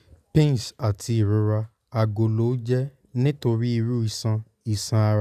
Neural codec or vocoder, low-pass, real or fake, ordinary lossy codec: none; 14.4 kHz; real; none